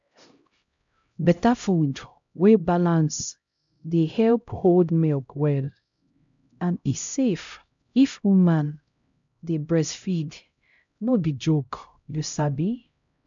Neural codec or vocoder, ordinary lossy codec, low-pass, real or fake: codec, 16 kHz, 0.5 kbps, X-Codec, HuBERT features, trained on LibriSpeech; MP3, 64 kbps; 7.2 kHz; fake